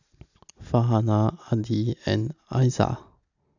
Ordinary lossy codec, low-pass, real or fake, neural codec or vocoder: none; 7.2 kHz; real; none